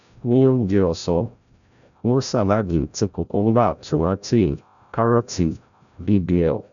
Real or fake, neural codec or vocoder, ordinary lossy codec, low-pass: fake; codec, 16 kHz, 0.5 kbps, FreqCodec, larger model; none; 7.2 kHz